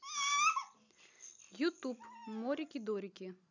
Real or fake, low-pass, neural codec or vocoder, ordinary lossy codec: real; 7.2 kHz; none; none